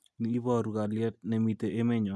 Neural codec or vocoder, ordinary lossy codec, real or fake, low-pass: none; none; real; none